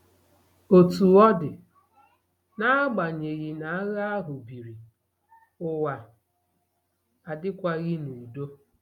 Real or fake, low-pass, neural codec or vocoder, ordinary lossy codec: real; 19.8 kHz; none; none